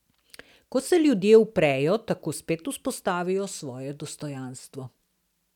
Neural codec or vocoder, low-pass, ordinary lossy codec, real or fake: none; 19.8 kHz; none; real